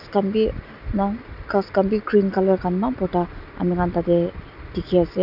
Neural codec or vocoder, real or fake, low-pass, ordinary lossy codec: none; real; 5.4 kHz; none